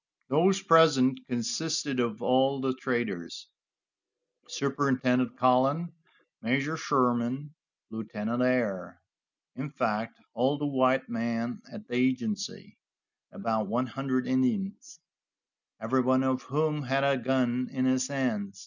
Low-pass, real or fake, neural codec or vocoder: 7.2 kHz; real; none